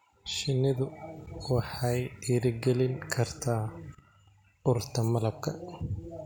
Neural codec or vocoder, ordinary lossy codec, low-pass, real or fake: none; none; none; real